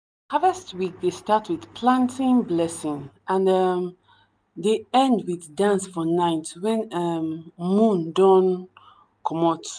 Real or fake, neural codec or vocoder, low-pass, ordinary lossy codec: real; none; none; none